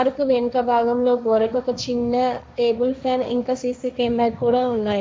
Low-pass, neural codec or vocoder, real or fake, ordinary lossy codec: none; codec, 16 kHz, 1.1 kbps, Voila-Tokenizer; fake; none